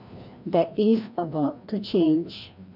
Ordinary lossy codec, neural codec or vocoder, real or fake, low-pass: none; codec, 16 kHz, 1 kbps, FreqCodec, larger model; fake; 5.4 kHz